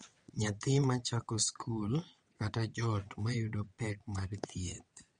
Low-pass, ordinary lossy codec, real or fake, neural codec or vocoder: 9.9 kHz; MP3, 48 kbps; fake; vocoder, 22.05 kHz, 80 mel bands, WaveNeXt